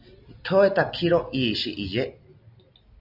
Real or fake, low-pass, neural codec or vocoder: real; 5.4 kHz; none